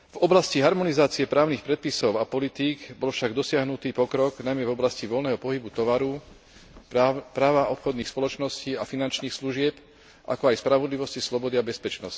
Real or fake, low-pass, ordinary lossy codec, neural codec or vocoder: real; none; none; none